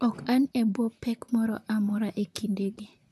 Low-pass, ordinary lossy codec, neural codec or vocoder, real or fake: 14.4 kHz; none; vocoder, 44.1 kHz, 128 mel bands, Pupu-Vocoder; fake